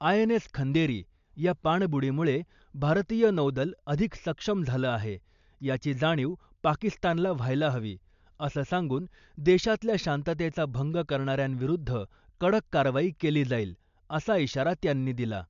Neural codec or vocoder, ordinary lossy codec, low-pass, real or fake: none; MP3, 64 kbps; 7.2 kHz; real